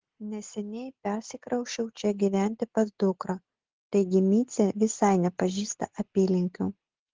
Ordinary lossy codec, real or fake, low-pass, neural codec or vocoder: Opus, 16 kbps; real; 7.2 kHz; none